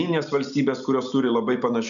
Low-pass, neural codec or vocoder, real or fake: 7.2 kHz; none; real